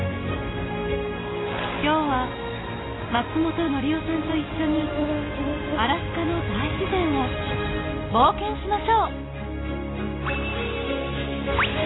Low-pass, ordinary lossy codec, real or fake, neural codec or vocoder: 7.2 kHz; AAC, 16 kbps; real; none